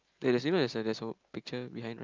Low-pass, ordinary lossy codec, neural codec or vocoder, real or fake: 7.2 kHz; Opus, 24 kbps; none; real